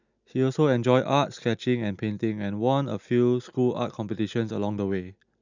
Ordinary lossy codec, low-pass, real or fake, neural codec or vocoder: none; 7.2 kHz; real; none